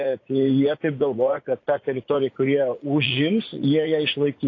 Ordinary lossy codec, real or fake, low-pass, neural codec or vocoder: AAC, 32 kbps; fake; 7.2 kHz; vocoder, 44.1 kHz, 80 mel bands, Vocos